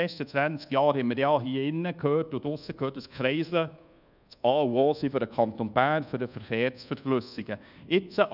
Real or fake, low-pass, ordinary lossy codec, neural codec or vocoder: fake; 5.4 kHz; none; codec, 24 kHz, 1.2 kbps, DualCodec